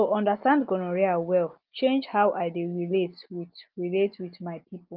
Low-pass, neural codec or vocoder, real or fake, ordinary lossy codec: 5.4 kHz; none; real; Opus, 24 kbps